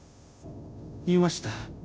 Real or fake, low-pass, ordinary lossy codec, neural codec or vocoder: fake; none; none; codec, 16 kHz, 0.5 kbps, FunCodec, trained on Chinese and English, 25 frames a second